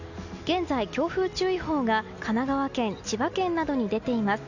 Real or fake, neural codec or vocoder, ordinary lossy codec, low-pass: real; none; none; 7.2 kHz